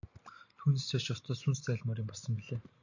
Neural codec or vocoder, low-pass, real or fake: none; 7.2 kHz; real